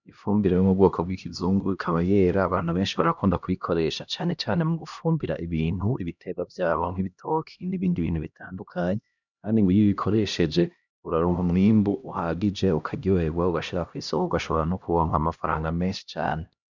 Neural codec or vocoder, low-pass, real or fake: codec, 16 kHz, 1 kbps, X-Codec, HuBERT features, trained on LibriSpeech; 7.2 kHz; fake